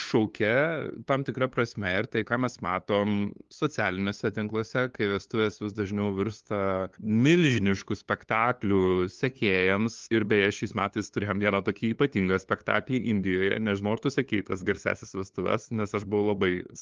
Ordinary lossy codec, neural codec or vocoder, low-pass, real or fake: Opus, 32 kbps; codec, 16 kHz, 2 kbps, FunCodec, trained on LibriTTS, 25 frames a second; 7.2 kHz; fake